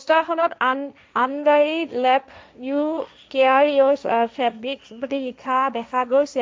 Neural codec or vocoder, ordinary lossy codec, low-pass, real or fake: codec, 16 kHz, 1.1 kbps, Voila-Tokenizer; none; none; fake